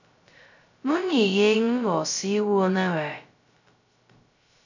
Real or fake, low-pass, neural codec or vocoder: fake; 7.2 kHz; codec, 16 kHz, 0.2 kbps, FocalCodec